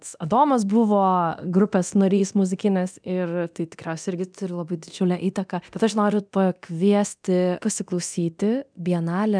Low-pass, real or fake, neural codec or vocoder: 9.9 kHz; fake; codec, 24 kHz, 0.9 kbps, DualCodec